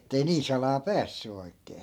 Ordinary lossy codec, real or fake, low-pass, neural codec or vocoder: none; fake; 19.8 kHz; vocoder, 44.1 kHz, 128 mel bands every 512 samples, BigVGAN v2